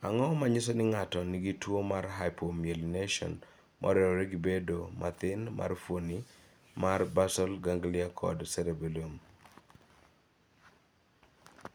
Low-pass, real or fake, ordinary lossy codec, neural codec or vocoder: none; real; none; none